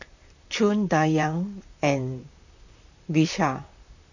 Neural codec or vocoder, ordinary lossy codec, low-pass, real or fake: vocoder, 44.1 kHz, 128 mel bands, Pupu-Vocoder; none; 7.2 kHz; fake